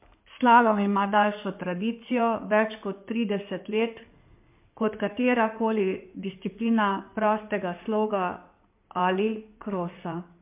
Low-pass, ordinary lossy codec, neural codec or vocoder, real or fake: 3.6 kHz; MP3, 32 kbps; codec, 16 kHz in and 24 kHz out, 2.2 kbps, FireRedTTS-2 codec; fake